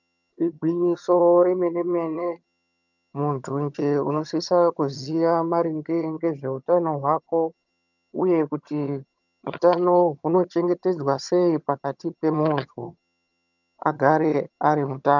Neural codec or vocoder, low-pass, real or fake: vocoder, 22.05 kHz, 80 mel bands, HiFi-GAN; 7.2 kHz; fake